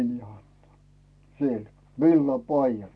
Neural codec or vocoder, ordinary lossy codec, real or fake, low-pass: none; none; real; none